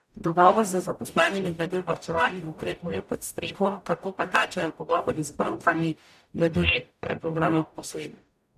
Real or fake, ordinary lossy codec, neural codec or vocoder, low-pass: fake; none; codec, 44.1 kHz, 0.9 kbps, DAC; 14.4 kHz